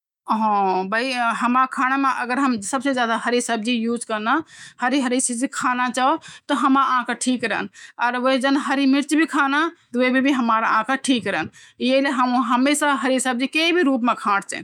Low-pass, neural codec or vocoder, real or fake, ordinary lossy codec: 19.8 kHz; autoencoder, 48 kHz, 128 numbers a frame, DAC-VAE, trained on Japanese speech; fake; none